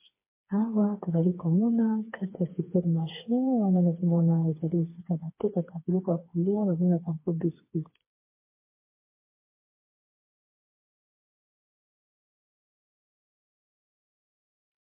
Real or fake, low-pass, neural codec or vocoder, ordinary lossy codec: fake; 3.6 kHz; codec, 16 kHz, 4 kbps, FreqCodec, smaller model; MP3, 24 kbps